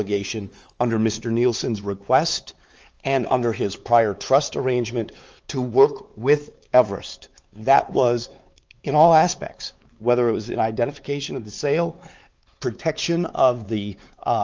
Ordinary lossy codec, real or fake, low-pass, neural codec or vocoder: Opus, 24 kbps; fake; 7.2 kHz; codec, 16 kHz, 4 kbps, X-Codec, WavLM features, trained on Multilingual LibriSpeech